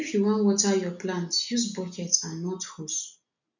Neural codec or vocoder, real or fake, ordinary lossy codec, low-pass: none; real; none; 7.2 kHz